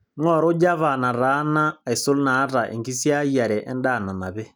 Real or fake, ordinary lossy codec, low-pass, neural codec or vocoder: real; none; none; none